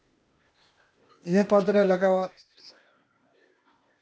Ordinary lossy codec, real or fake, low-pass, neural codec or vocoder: none; fake; none; codec, 16 kHz, 0.8 kbps, ZipCodec